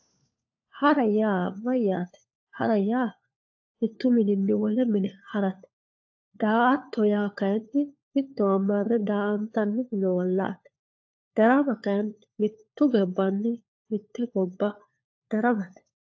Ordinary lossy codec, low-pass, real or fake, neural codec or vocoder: AAC, 48 kbps; 7.2 kHz; fake; codec, 16 kHz, 4 kbps, FunCodec, trained on LibriTTS, 50 frames a second